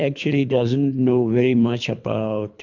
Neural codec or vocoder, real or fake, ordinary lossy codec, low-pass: codec, 24 kHz, 3 kbps, HILCodec; fake; MP3, 64 kbps; 7.2 kHz